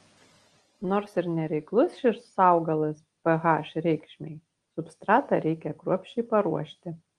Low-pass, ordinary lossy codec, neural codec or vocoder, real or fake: 9.9 kHz; Opus, 24 kbps; none; real